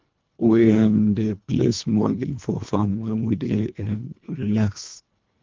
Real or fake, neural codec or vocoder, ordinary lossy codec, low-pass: fake; codec, 24 kHz, 1.5 kbps, HILCodec; Opus, 24 kbps; 7.2 kHz